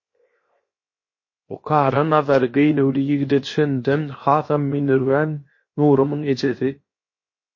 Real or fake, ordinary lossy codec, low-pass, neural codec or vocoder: fake; MP3, 32 kbps; 7.2 kHz; codec, 16 kHz, 0.7 kbps, FocalCodec